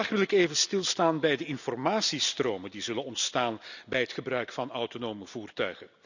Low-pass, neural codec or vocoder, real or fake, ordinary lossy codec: 7.2 kHz; none; real; none